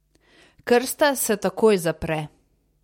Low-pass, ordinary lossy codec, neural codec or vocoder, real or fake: 19.8 kHz; MP3, 64 kbps; none; real